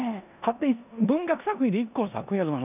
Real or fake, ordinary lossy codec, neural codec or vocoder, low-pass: fake; none; codec, 16 kHz in and 24 kHz out, 0.9 kbps, LongCat-Audio-Codec, four codebook decoder; 3.6 kHz